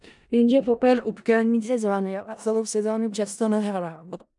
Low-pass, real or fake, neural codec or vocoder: 10.8 kHz; fake; codec, 16 kHz in and 24 kHz out, 0.4 kbps, LongCat-Audio-Codec, four codebook decoder